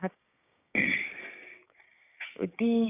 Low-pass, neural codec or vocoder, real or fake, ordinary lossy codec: 3.6 kHz; codec, 16 kHz, 6 kbps, DAC; fake; none